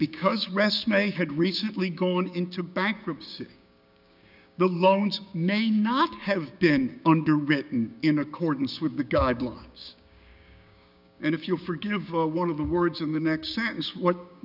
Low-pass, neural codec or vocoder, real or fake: 5.4 kHz; codec, 16 kHz, 6 kbps, DAC; fake